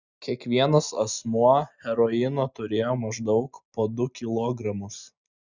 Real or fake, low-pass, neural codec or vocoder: real; 7.2 kHz; none